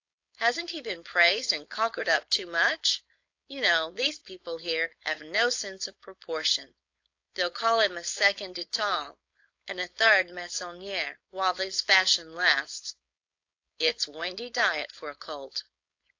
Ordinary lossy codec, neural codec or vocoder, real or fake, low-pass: AAC, 48 kbps; codec, 16 kHz, 4.8 kbps, FACodec; fake; 7.2 kHz